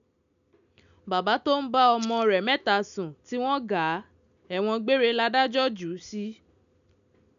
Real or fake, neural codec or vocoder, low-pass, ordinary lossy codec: real; none; 7.2 kHz; none